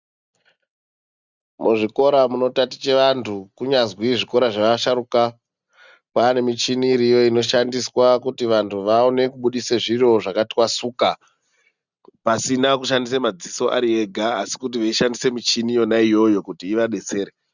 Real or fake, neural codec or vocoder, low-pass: real; none; 7.2 kHz